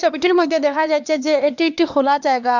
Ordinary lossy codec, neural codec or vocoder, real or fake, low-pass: none; codec, 16 kHz, 2 kbps, X-Codec, HuBERT features, trained on LibriSpeech; fake; 7.2 kHz